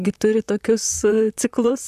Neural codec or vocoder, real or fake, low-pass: vocoder, 44.1 kHz, 128 mel bands, Pupu-Vocoder; fake; 14.4 kHz